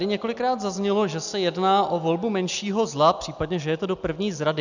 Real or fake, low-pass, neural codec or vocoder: real; 7.2 kHz; none